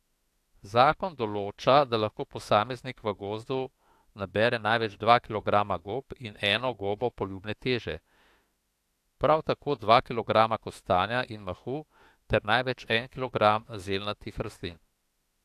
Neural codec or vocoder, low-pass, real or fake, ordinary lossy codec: autoencoder, 48 kHz, 32 numbers a frame, DAC-VAE, trained on Japanese speech; 14.4 kHz; fake; AAC, 64 kbps